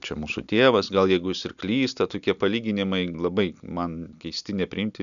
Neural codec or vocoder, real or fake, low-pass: none; real; 7.2 kHz